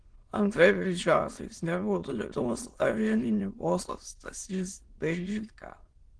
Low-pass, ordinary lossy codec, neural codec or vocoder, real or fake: 9.9 kHz; Opus, 16 kbps; autoencoder, 22.05 kHz, a latent of 192 numbers a frame, VITS, trained on many speakers; fake